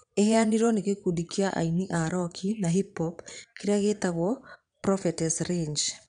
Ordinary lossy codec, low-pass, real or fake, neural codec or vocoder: none; 9.9 kHz; fake; vocoder, 22.05 kHz, 80 mel bands, Vocos